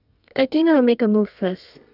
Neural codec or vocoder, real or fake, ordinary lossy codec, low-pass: codec, 32 kHz, 1.9 kbps, SNAC; fake; none; 5.4 kHz